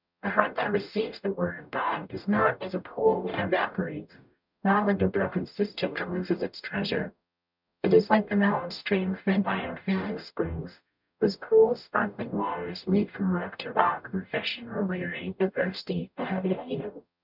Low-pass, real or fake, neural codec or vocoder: 5.4 kHz; fake; codec, 44.1 kHz, 0.9 kbps, DAC